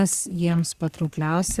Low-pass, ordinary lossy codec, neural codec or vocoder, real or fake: 14.4 kHz; Opus, 64 kbps; codec, 44.1 kHz, 3.4 kbps, Pupu-Codec; fake